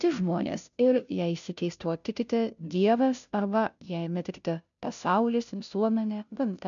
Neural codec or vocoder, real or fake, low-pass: codec, 16 kHz, 0.5 kbps, FunCodec, trained on Chinese and English, 25 frames a second; fake; 7.2 kHz